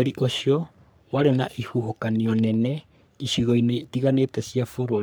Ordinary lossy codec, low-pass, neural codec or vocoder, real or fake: none; none; codec, 44.1 kHz, 3.4 kbps, Pupu-Codec; fake